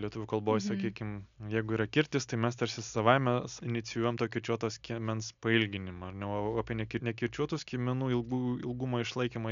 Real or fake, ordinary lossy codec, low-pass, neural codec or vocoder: real; MP3, 96 kbps; 7.2 kHz; none